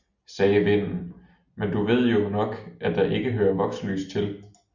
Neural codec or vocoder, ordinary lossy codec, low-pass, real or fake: none; Opus, 64 kbps; 7.2 kHz; real